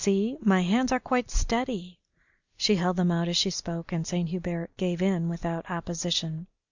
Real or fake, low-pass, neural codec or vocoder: real; 7.2 kHz; none